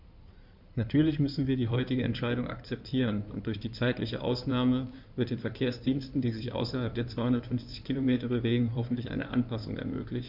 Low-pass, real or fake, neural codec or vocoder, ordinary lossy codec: 5.4 kHz; fake; codec, 16 kHz in and 24 kHz out, 2.2 kbps, FireRedTTS-2 codec; none